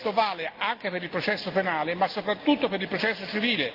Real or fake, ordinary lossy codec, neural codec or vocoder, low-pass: real; Opus, 32 kbps; none; 5.4 kHz